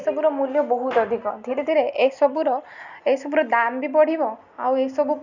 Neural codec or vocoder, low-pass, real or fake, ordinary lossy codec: none; 7.2 kHz; real; none